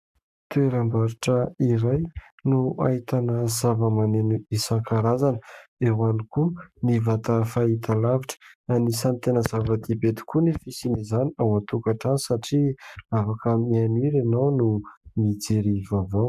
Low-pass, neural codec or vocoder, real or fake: 14.4 kHz; codec, 44.1 kHz, 7.8 kbps, Pupu-Codec; fake